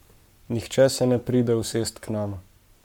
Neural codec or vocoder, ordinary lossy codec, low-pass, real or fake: codec, 44.1 kHz, 7.8 kbps, Pupu-Codec; MP3, 96 kbps; 19.8 kHz; fake